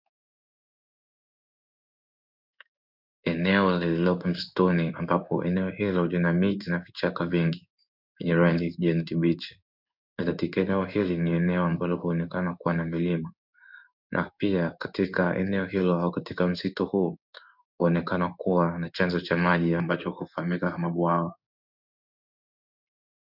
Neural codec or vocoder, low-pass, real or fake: codec, 16 kHz in and 24 kHz out, 1 kbps, XY-Tokenizer; 5.4 kHz; fake